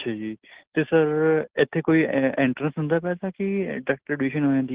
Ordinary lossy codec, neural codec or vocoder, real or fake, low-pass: Opus, 16 kbps; none; real; 3.6 kHz